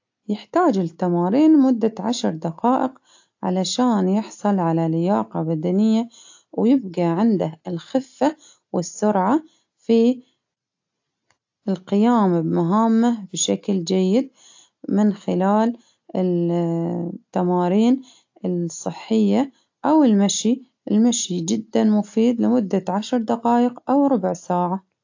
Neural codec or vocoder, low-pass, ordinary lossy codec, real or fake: none; 7.2 kHz; AAC, 48 kbps; real